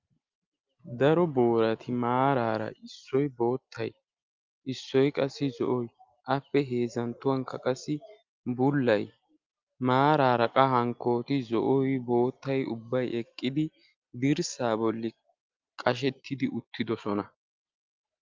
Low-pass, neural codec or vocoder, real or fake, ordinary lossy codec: 7.2 kHz; none; real; Opus, 24 kbps